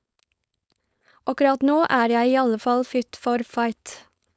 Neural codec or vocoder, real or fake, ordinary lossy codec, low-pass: codec, 16 kHz, 4.8 kbps, FACodec; fake; none; none